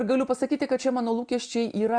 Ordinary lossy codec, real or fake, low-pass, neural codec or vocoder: Opus, 64 kbps; real; 9.9 kHz; none